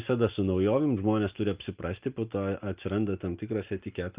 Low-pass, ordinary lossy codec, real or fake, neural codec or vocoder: 3.6 kHz; Opus, 24 kbps; real; none